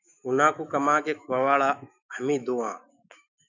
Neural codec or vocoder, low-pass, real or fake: autoencoder, 48 kHz, 128 numbers a frame, DAC-VAE, trained on Japanese speech; 7.2 kHz; fake